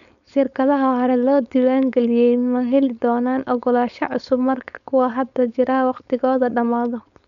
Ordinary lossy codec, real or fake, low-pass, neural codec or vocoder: none; fake; 7.2 kHz; codec, 16 kHz, 4.8 kbps, FACodec